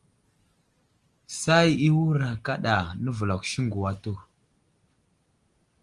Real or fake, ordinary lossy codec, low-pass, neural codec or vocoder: real; Opus, 24 kbps; 10.8 kHz; none